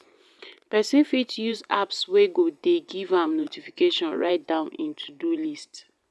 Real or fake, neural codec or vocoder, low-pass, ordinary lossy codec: fake; vocoder, 24 kHz, 100 mel bands, Vocos; none; none